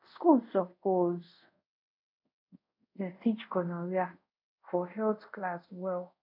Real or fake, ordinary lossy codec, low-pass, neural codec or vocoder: fake; none; 5.4 kHz; codec, 24 kHz, 0.5 kbps, DualCodec